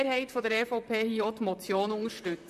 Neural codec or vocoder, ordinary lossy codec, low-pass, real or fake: vocoder, 44.1 kHz, 128 mel bands, Pupu-Vocoder; MP3, 64 kbps; 14.4 kHz; fake